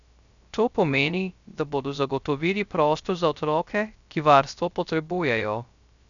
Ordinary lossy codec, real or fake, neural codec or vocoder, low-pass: none; fake; codec, 16 kHz, 0.3 kbps, FocalCodec; 7.2 kHz